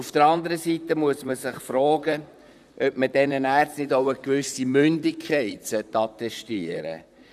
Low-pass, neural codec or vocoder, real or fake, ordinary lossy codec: 14.4 kHz; vocoder, 44.1 kHz, 128 mel bands, Pupu-Vocoder; fake; none